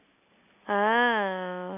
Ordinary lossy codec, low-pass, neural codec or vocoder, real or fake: none; 3.6 kHz; none; real